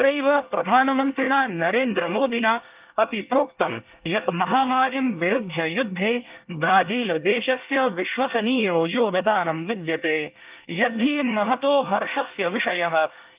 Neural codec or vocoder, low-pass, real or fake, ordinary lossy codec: codec, 24 kHz, 1 kbps, SNAC; 3.6 kHz; fake; Opus, 24 kbps